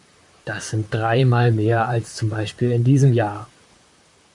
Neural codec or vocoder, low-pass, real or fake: vocoder, 44.1 kHz, 128 mel bands, Pupu-Vocoder; 10.8 kHz; fake